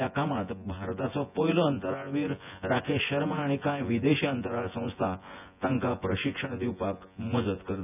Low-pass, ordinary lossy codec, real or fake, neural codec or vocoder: 3.6 kHz; none; fake; vocoder, 24 kHz, 100 mel bands, Vocos